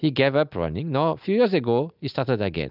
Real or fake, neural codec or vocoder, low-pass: real; none; 5.4 kHz